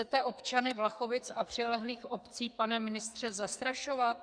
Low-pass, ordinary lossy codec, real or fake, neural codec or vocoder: 9.9 kHz; Opus, 64 kbps; fake; codec, 44.1 kHz, 2.6 kbps, SNAC